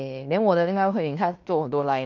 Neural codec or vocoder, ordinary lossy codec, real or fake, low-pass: codec, 16 kHz in and 24 kHz out, 0.9 kbps, LongCat-Audio-Codec, fine tuned four codebook decoder; none; fake; 7.2 kHz